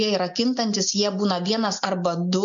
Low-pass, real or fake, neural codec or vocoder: 7.2 kHz; real; none